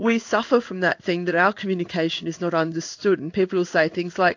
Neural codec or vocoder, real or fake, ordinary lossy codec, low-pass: codec, 16 kHz in and 24 kHz out, 1 kbps, XY-Tokenizer; fake; AAC, 48 kbps; 7.2 kHz